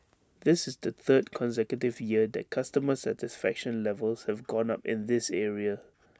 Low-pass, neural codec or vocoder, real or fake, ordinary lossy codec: none; none; real; none